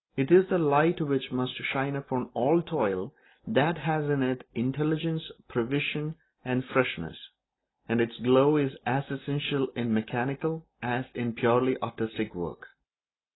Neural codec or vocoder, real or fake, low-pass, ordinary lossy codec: none; real; 7.2 kHz; AAC, 16 kbps